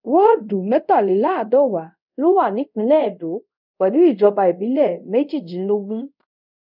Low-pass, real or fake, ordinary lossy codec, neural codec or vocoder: 5.4 kHz; fake; none; codec, 24 kHz, 0.5 kbps, DualCodec